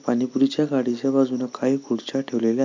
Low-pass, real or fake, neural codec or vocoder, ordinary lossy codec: 7.2 kHz; real; none; MP3, 48 kbps